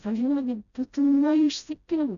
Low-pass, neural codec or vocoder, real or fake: 7.2 kHz; codec, 16 kHz, 0.5 kbps, FreqCodec, smaller model; fake